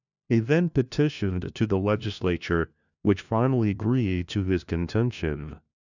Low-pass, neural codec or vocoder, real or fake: 7.2 kHz; codec, 16 kHz, 1 kbps, FunCodec, trained on LibriTTS, 50 frames a second; fake